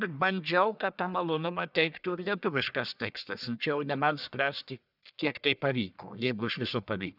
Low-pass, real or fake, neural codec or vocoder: 5.4 kHz; fake; codec, 44.1 kHz, 1.7 kbps, Pupu-Codec